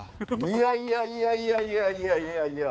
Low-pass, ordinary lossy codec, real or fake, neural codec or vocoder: none; none; fake; codec, 16 kHz, 4 kbps, X-Codec, HuBERT features, trained on balanced general audio